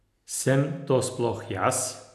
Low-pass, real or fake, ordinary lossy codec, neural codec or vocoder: 14.4 kHz; real; none; none